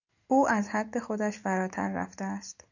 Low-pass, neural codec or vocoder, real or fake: 7.2 kHz; none; real